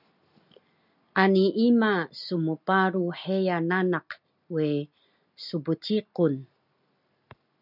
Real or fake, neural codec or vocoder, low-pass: real; none; 5.4 kHz